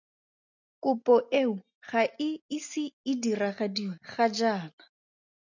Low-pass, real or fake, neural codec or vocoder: 7.2 kHz; real; none